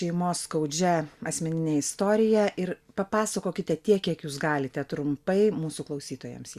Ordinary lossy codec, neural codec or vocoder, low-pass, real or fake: Opus, 64 kbps; none; 14.4 kHz; real